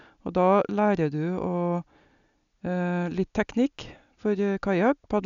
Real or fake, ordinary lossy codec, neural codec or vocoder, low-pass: real; none; none; 7.2 kHz